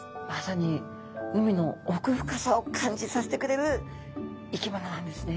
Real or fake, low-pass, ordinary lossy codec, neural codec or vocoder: real; none; none; none